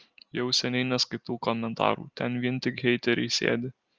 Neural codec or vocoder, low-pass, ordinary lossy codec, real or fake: none; 7.2 kHz; Opus, 24 kbps; real